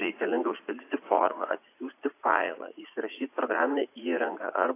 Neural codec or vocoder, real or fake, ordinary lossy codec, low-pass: vocoder, 44.1 kHz, 80 mel bands, Vocos; fake; AAC, 24 kbps; 3.6 kHz